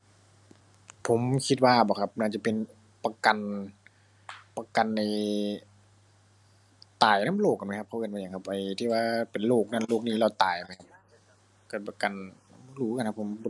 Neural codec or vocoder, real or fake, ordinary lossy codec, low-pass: none; real; none; none